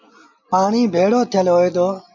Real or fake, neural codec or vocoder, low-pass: real; none; 7.2 kHz